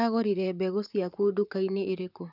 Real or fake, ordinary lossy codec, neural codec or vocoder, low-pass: fake; none; vocoder, 44.1 kHz, 128 mel bands, Pupu-Vocoder; 5.4 kHz